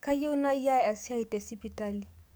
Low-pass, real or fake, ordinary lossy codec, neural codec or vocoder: none; fake; none; codec, 44.1 kHz, 7.8 kbps, DAC